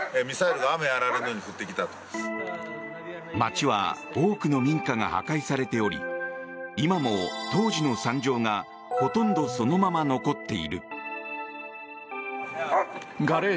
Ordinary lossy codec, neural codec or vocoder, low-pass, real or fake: none; none; none; real